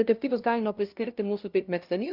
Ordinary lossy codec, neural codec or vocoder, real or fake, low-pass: Opus, 24 kbps; codec, 16 kHz, 0.5 kbps, FunCodec, trained on LibriTTS, 25 frames a second; fake; 5.4 kHz